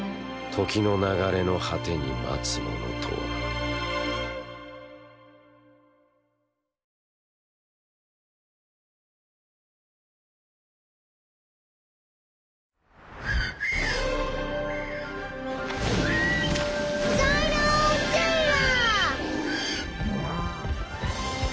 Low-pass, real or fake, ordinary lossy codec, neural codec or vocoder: none; real; none; none